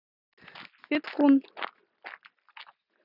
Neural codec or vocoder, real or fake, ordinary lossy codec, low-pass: none; real; none; 5.4 kHz